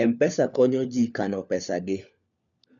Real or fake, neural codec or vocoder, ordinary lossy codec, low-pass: fake; codec, 16 kHz, 4 kbps, FunCodec, trained on LibriTTS, 50 frames a second; none; 7.2 kHz